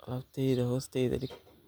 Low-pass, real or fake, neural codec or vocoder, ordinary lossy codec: none; real; none; none